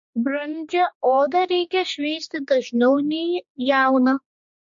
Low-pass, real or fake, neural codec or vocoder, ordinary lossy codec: 7.2 kHz; fake; codec, 16 kHz, 2 kbps, X-Codec, HuBERT features, trained on general audio; MP3, 48 kbps